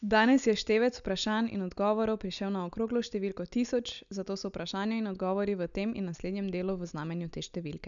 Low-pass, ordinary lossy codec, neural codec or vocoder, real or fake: 7.2 kHz; none; none; real